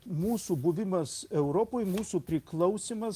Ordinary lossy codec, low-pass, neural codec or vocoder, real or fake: Opus, 24 kbps; 14.4 kHz; none; real